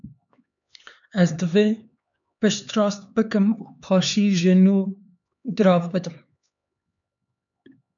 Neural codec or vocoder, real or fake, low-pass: codec, 16 kHz, 4 kbps, X-Codec, HuBERT features, trained on LibriSpeech; fake; 7.2 kHz